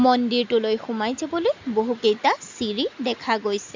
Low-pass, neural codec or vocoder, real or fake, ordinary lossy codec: 7.2 kHz; none; real; MP3, 64 kbps